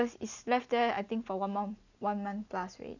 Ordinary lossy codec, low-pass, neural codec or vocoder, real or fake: none; 7.2 kHz; none; real